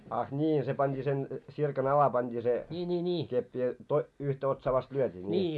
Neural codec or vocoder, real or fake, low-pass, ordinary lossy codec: none; real; none; none